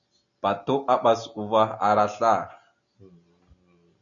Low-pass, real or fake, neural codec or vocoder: 7.2 kHz; real; none